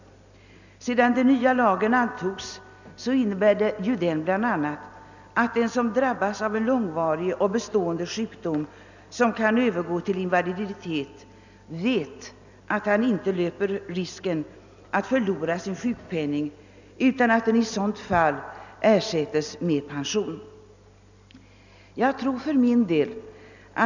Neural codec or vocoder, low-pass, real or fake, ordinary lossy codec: none; 7.2 kHz; real; none